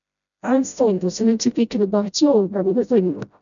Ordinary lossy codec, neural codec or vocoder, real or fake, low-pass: MP3, 96 kbps; codec, 16 kHz, 0.5 kbps, FreqCodec, smaller model; fake; 7.2 kHz